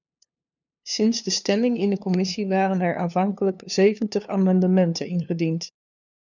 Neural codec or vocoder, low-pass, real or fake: codec, 16 kHz, 2 kbps, FunCodec, trained on LibriTTS, 25 frames a second; 7.2 kHz; fake